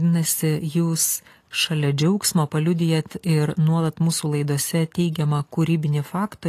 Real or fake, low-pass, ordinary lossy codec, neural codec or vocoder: real; 14.4 kHz; AAC, 48 kbps; none